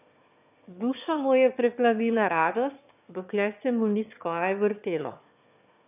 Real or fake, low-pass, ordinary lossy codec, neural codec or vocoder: fake; 3.6 kHz; none; autoencoder, 22.05 kHz, a latent of 192 numbers a frame, VITS, trained on one speaker